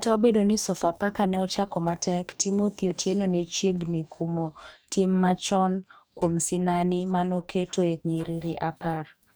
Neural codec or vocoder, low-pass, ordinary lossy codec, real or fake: codec, 44.1 kHz, 2.6 kbps, DAC; none; none; fake